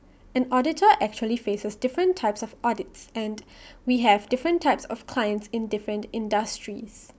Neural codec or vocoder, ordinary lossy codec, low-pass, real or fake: none; none; none; real